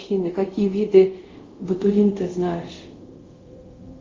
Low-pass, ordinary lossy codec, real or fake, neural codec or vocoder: 7.2 kHz; Opus, 32 kbps; fake; codec, 24 kHz, 0.5 kbps, DualCodec